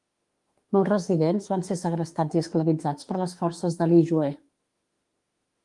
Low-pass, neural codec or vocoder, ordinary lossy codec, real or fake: 10.8 kHz; autoencoder, 48 kHz, 32 numbers a frame, DAC-VAE, trained on Japanese speech; Opus, 24 kbps; fake